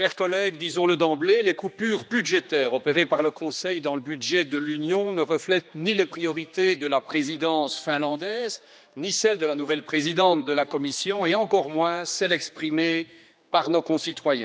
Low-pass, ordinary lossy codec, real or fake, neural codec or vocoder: none; none; fake; codec, 16 kHz, 2 kbps, X-Codec, HuBERT features, trained on general audio